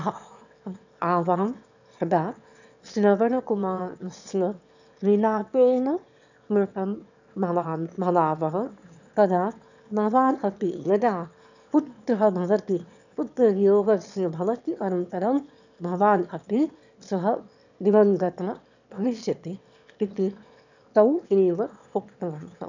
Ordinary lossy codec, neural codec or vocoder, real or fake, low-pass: none; autoencoder, 22.05 kHz, a latent of 192 numbers a frame, VITS, trained on one speaker; fake; 7.2 kHz